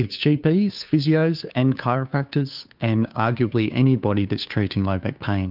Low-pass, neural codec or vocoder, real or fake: 5.4 kHz; codec, 16 kHz, 2 kbps, FunCodec, trained on Chinese and English, 25 frames a second; fake